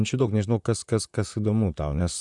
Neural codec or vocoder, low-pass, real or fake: vocoder, 44.1 kHz, 128 mel bands, Pupu-Vocoder; 10.8 kHz; fake